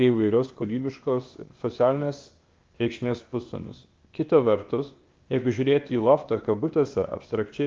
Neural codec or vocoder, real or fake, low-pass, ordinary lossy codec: codec, 16 kHz, 0.8 kbps, ZipCodec; fake; 7.2 kHz; Opus, 24 kbps